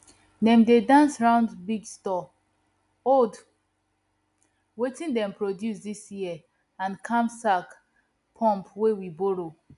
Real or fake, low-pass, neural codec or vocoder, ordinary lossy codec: real; 10.8 kHz; none; MP3, 96 kbps